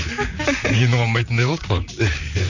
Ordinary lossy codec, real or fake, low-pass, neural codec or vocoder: none; fake; 7.2 kHz; vocoder, 44.1 kHz, 128 mel bands, Pupu-Vocoder